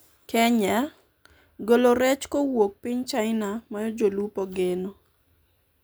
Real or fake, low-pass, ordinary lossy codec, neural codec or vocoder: real; none; none; none